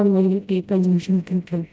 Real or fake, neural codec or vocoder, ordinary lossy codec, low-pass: fake; codec, 16 kHz, 0.5 kbps, FreqCodec, smaller model; none; none